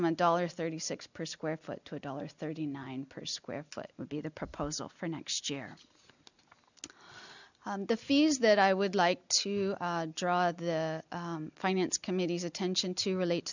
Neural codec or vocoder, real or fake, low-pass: none; real; 7.2 kHz